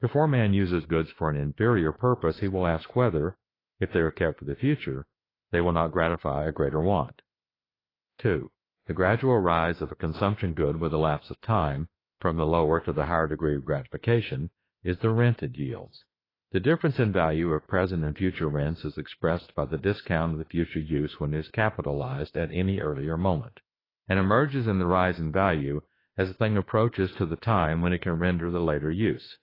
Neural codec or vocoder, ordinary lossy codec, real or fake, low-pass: autoencoder, 48 kHz, 32 numbers a frame, DAC-VAE, trained on Japanese speech; AAC, 24 kbps; fake; 5.4 kHz